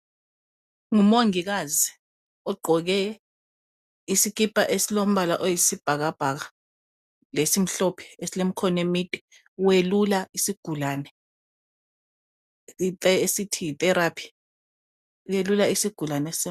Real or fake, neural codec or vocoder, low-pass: real; none; 14.4 kHz